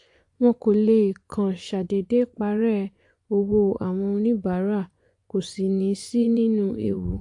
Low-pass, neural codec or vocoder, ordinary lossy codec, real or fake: 10.8 kHz; vocoder, 24 kHz, 100 mel bands, Vocos; AAC, 64 kbps; fake